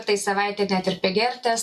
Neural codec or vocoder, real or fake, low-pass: none; real; 14.4 kHz